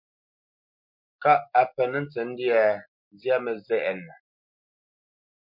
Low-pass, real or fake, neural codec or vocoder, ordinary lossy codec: 5.4 kHz; real; none; MP3, 48 kbps